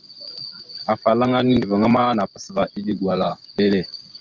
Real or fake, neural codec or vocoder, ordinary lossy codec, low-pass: fake; vocoder, 24 kHz, 100 mel bands, Vocos; Opus, 32 kbps; 7.2 kHz